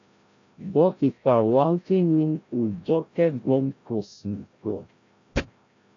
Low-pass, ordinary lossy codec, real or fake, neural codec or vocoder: 7.2 kHz; AAC, 48 kbps; fake; codec, 16 kHz, 0.5 kbps, FreqCodec, larger model